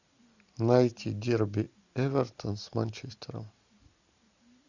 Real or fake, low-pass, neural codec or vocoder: real; 7.2 kHz; none